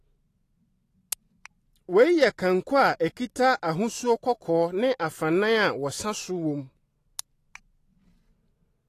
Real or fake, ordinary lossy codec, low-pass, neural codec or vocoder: real; AAC, 48 kbps; 14.4 kHz; none